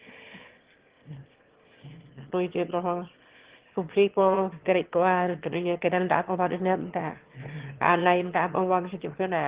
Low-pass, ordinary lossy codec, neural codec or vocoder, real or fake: 3.6 kHz; Opus, 16 kbps; autoencoder, 22.05 kHz, a latent of 192 numbers a frame, VITS, trained on one speaker; fake